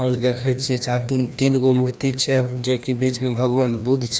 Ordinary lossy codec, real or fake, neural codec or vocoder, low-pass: none; fake; codec, 16 kHz, 1 kbps, FreqCodec, larger model; none